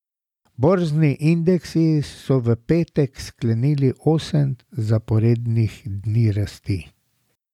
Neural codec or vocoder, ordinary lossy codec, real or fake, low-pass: none; none; real; 19.8 kHz